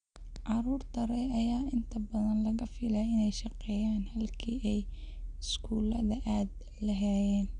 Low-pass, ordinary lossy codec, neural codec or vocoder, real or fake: 9.9 kHz; none; none; real